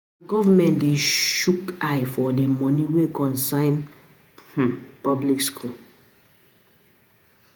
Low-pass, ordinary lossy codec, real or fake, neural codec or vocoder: none; none; fake; vocoder, 48 kHz, 128 mel bands, Vocos